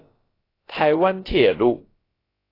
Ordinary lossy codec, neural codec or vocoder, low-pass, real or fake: AAC, 24 kbps; codec, 16 kHz, about 1 kbps, DyCAST, with the encoder's durations; 5.4 kHz; fake